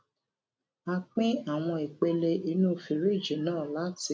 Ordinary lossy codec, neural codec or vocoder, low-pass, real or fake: none; none; none; real